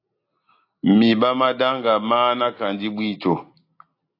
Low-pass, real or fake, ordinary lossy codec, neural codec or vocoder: 5.4 kHz; real; AAC, 32 kbps; none